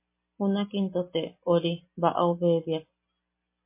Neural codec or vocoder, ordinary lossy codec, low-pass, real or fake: none; MP3, 24 kbps; 3.6 kHz; real